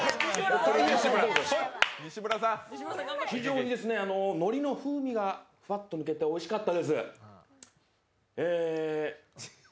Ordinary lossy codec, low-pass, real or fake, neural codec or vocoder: none; none; real; none